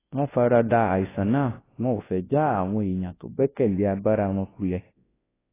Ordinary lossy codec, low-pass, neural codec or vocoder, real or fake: AAC, 16 kbps; 3.6 kHz; codec, 24 kHz, 0.9 kbps, WavTokenizer, large speech release; fake